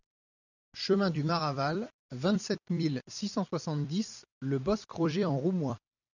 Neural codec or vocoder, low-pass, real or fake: vocoder, 44.1 kHz, 128 mel bands every 256 samples, BigVGAN v2; 7.2 kHz; fake